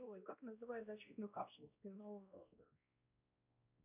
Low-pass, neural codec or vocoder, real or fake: 3.6 kHz; codec, 16 kHz, 1 kbps, X-Codec, WavLM features, trained on Multilingual LibriSpeech; fake